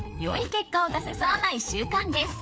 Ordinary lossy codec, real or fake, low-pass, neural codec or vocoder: none; fake; none; codec, 16 kHz, 4 kbps, FreqCodec, larger model